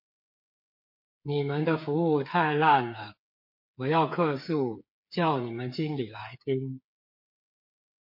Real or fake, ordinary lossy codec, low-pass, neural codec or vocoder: fake; MP3, 32 kbps; 5.4 kHz; codec, 16 kHz, 8 kbps, FreqCodec, smaller model